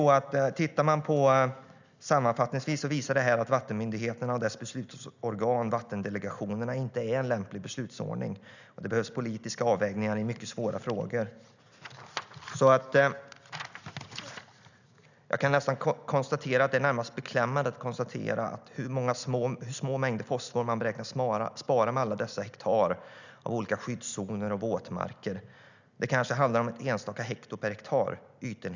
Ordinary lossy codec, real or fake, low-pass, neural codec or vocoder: none; real; 7.2 kHz; none